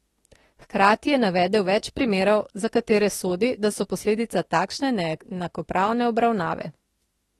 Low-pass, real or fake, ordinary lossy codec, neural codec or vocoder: 19.8 kHz; fake; AAC, 32 kbps; autoencoder, 48 kHz, 32 numbers a frame, DAC-VAE, trained on Japanese speech